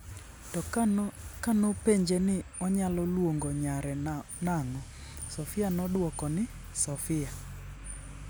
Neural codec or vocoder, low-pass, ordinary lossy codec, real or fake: none; none; none; real